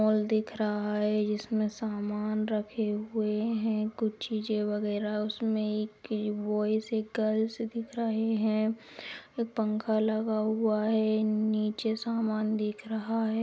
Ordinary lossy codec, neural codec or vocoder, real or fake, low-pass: none; none; real; none